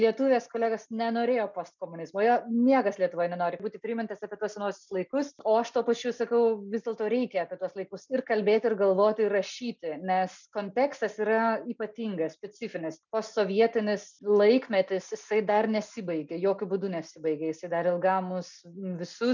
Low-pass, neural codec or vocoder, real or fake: 7.2 kHz; none; real